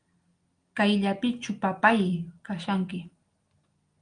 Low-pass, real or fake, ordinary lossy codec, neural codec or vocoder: 9.9 kHz; real; Opus, 32 kbps; none